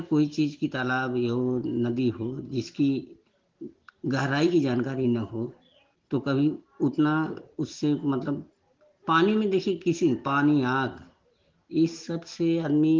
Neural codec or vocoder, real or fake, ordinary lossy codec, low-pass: none; real; Opus, 16 kbps; 7.2 kHz